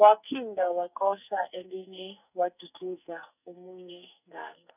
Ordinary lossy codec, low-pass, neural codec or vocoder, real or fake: none; 3.6 kHz; codec, 44.1 kHz, 2.6 kbps, SNAC; fake